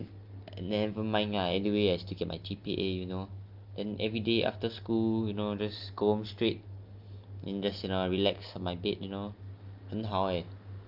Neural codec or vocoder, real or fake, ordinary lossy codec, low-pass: none; real; Opus, 24 kbps; 5.4 kHz